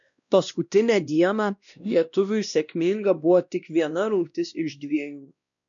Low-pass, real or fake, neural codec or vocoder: 7.2 kHz; fake; codec, 16 kHz, 1 kbps, X-Codec, WavLM features, trained on Multilingual LibriSpeech